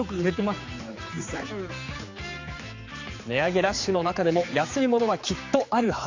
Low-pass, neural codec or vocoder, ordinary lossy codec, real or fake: 7.2 kHz; codec, 16 kHz, 4 kbps, X-Codec, HuBERT features, trained on general audio; AAC, 48 kbps; fake